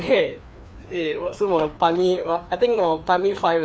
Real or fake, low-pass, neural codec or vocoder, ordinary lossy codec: fake; none; codec, 16 kHz, 2 kbps, FreqCodec, larger model; none